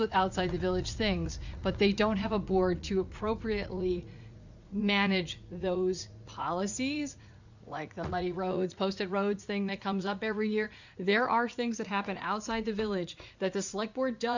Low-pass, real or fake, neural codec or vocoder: 7.2 kHz; fake; vocoder, 44.1 kHz, 80 mel bands, Vocos